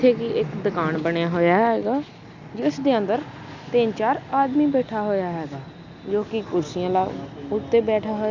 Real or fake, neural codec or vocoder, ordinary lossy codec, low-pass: real; none; none; 7.2 kHz